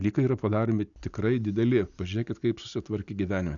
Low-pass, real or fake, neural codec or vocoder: 7.2 kHz; real; none